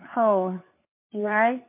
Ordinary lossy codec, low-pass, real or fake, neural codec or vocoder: MP3, 24 kbps; 3.6 kHz; fake; codec, 16 kHz, 4 kbps, FunCodec, trained on LibriTTS, 50 frames a second